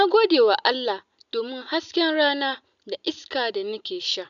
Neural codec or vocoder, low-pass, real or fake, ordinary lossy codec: none; 7.2 kHz; real; none